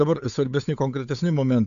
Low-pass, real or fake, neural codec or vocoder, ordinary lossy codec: 7.2 kHz; fake; codec, 16 kHz, 8 kbps, FunCodec, trained on Chinese and English, 25 frames a second; AAC, 64 kbps